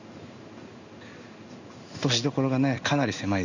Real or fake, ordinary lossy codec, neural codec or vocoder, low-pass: fake; none; codec, 16 kHz in and 24 kHz out, 1 kbps, XY-Tokenizer; 7.2 kHz